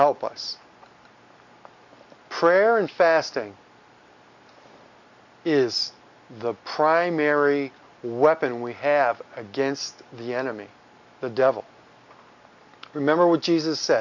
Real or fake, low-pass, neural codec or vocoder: real; 7.2 kHz; none